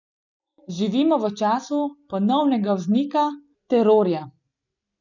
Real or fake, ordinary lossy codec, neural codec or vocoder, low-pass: real; none; none; 7.2 kHz